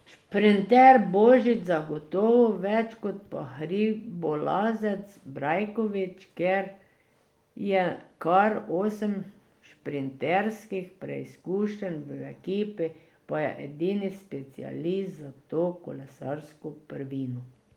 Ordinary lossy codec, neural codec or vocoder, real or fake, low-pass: Opus, 24 kbps; none; real; 19.8 kHz